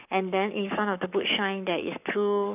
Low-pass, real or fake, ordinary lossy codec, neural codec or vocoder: 3.6 kHz; fake; none; codec, 44.1 kHz, 7.8 kbps, Pupu-Codec